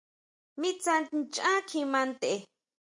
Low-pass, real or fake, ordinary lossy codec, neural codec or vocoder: 10.8 kHz; real; MP3, 64 kbps; none